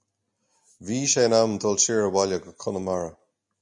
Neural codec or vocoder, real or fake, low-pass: none; real; 10.8 kHz